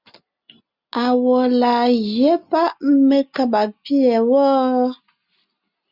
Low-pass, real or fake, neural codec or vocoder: 5.4 kHz; real; none